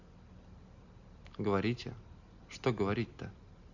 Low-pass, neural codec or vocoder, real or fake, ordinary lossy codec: 7.2 kHz; none; real; none